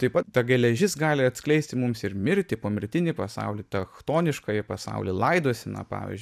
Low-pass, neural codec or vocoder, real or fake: 14.4 kHz; none; real